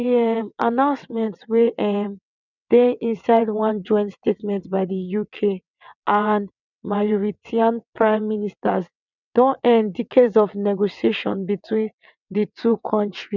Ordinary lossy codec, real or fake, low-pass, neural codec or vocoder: none; fake; 7.2 kHz; vocoder, 22.05 kHz, 80 mel bands, WaveNeXt